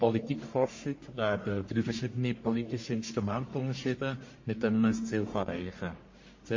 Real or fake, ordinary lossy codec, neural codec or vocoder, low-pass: fake; MP3, 32 kbps; codec, 44.1 kHz, 1.7 kbps, Pupu-Codec; 7.2 kHz